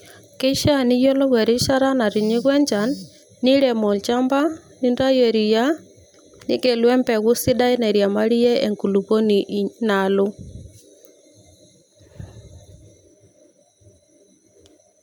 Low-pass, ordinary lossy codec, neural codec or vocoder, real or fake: none; none; none; real